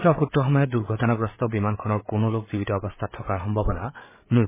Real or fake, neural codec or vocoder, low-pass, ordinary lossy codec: fake; codec, 16 kHz, 8 kbps, FreqCodec, larger model; 3.6 kHz; MP3, 16 kbps